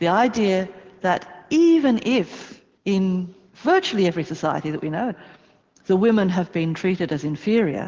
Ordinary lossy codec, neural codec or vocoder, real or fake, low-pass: Opus, 16 kbps; none; real; 7.2 kHz